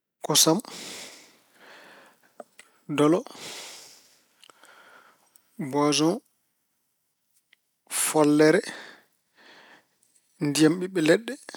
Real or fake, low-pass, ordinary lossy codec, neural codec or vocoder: real; none; none; none